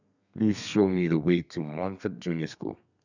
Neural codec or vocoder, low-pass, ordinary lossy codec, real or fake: codec, 32 kHz, 1.9 kbps, SNAC; 7.2 kHz; none; fake